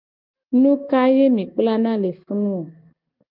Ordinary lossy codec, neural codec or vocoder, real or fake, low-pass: Opus, 32 kbps; none; real; 5.4 kHz